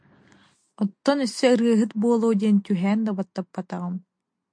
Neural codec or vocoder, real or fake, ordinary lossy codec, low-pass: none; real; MP3, 48 kbps; 9.9 kHz